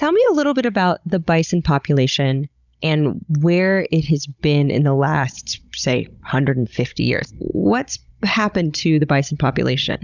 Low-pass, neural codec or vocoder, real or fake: 7.2 kHz; codec, 16 kHz, 16 kbps, FunCodec, trained on Chinese and English, 50 frames a second; fake